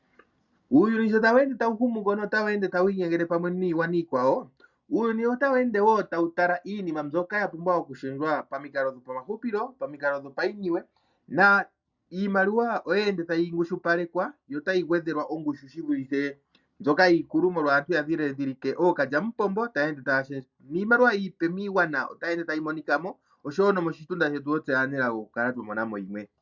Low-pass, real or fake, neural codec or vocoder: 7.2 kHz; real; none